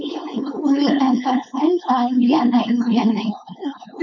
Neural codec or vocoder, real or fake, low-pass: codec, 16 kHz, 4.8 kbps, FACodec; fake; 7.2 kHz